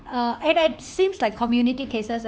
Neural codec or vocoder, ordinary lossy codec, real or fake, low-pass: codec, 16 kHz, 4 kbps, X-Codec, HuBERT features, trained on LibriSpeech; none; fake; none